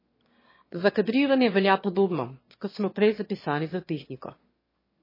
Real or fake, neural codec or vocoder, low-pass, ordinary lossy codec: fake; autoencoder, 22.05 kHz, a latent of 192 numbers a frame, VITS, trained on one speaker; 5.4 kHz; MP3, 24 kbps